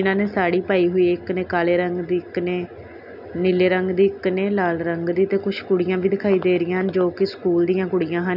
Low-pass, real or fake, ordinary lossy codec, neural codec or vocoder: 5.4 kHz; real; none; none